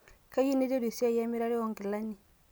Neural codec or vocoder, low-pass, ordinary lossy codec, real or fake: none; none; none; real